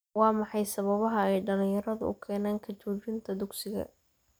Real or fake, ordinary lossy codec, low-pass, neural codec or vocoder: real; none; none; none